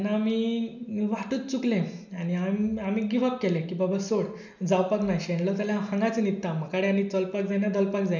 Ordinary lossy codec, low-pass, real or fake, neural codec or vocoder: none; 7.2 kHz; real; none